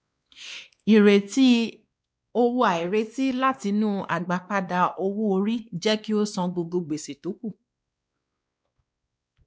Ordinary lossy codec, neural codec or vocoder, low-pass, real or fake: none; codec, 16 kHz, 2 kbps, X-Codec, WavLM features, trained on Multilingual LibriSpeech; none; fake